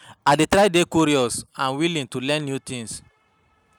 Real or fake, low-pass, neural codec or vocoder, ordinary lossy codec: real; 19.8 kHz; none; none